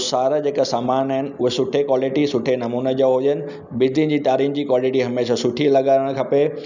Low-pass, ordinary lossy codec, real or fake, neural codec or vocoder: 7.2 kHz; none; real; none